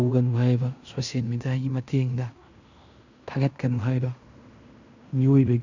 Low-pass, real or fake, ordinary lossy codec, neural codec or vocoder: 7.2 kHz; fake; none; codec, 16 kHz in and 24 kHz out, 0.9 kbps, LongCat-Audio-Codec, fine tuned four codebook decoder